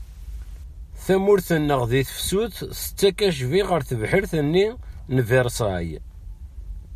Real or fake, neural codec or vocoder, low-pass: real; none; 14.4 kHz